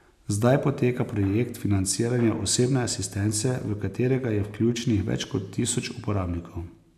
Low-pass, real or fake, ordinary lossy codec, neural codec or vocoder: 14.4 kHz; real; none; none